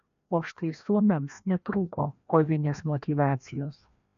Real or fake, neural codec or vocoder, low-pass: fake; codec, 16 kHz, 1 kbps, FreqCodec, larger model; 7.2 kHz